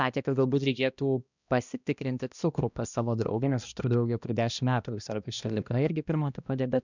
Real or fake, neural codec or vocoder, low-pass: fake; codec, 16 kHz, 1 kbps, X-Codec, HuBERT features, trained on balanced general audio; 7.2 kHz